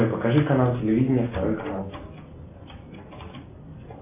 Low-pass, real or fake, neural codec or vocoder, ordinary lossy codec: 3.6 kHz; real; none; MP3, 24 kbps